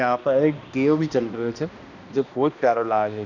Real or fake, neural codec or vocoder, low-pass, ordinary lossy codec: fake; codec, 16 kHz, 1 kbps, X-Codec, HuBERT features, trained on balanced general audio; 7.2 kHz; none